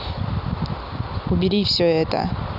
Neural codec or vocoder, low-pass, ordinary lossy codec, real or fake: none; 5.4 kHz; none; real